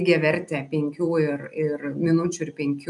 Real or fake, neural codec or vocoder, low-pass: real; none; 10.8 kHz